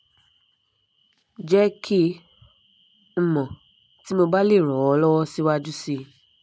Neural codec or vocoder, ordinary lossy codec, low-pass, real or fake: none; none; none; real